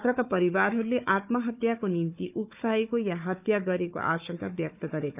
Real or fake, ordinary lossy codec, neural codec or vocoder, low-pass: fake; none; codec, 16 kHz, 4 kbps, FunCodec, trained on Chinese and English, 50 frames a second; 3.6 kHz